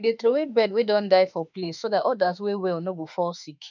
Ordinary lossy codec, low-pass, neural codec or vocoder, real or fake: none; 7.2 kHz; autoencoder, 48 kHz, 32 numbers a frame, DAC-VAE, trained on Japanese speech; fake